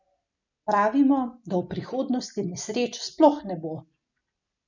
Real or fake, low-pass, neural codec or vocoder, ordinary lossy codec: real; 7.2 kHz; none; none